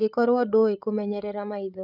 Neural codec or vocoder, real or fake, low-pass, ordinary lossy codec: codec, 16 kHz, 16 kbps, FreqCodec, larger model; fake; 5.4 kHz; none